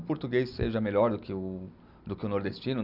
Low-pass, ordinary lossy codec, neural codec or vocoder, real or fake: 5.4 kHz; none; none; real